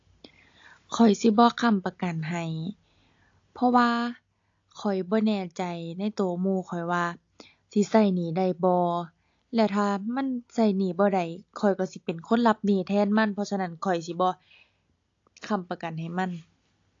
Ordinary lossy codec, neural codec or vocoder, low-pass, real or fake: AAC, 48 kbps; none; 7.2 kHz; real